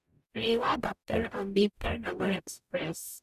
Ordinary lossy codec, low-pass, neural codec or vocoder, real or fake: none; 14.4 kHz; codec, 44.1 kHz, 0.9 kbps, DAC; fake